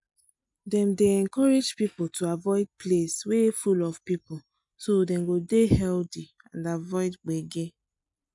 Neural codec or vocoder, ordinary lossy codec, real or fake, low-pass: none; MP3, 96 kbps; real; 10.8 kHz